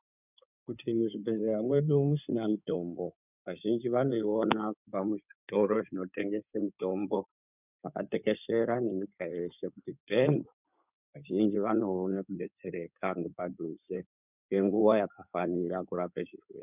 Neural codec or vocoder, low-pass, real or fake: codec, 16 kHz in and 24 kHz out, 2.2 kbps, FireRedTTS-2 codec; 3.6 kHz; fake